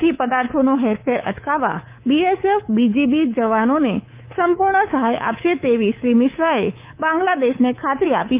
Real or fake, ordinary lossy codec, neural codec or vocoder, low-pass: fake; Opus, 24 kbps; codec, 24 kHz, 3.1 kbps, DualCodec; 3.6 kHz